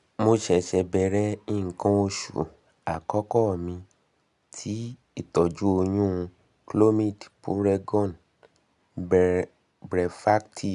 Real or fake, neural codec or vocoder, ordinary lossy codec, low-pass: real; none; none; 10.8 kHz